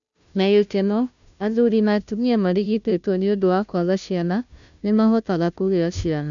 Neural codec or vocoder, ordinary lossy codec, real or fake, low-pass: codec, 16 kHz, 0.5 kbps, FunCodec, trained on Chinese and English, 25 frames a second; none; fake; 7.2 kHz